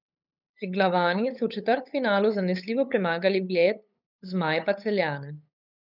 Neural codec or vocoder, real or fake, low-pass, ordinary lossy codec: codec, 16 kHz, 8 kbps, FunCodec, trained on LibriTTS, 25 frames a second; fake; 5.4 kHz; none